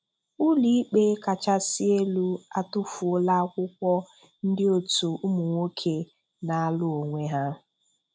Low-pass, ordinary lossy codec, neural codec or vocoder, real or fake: none; none; none; real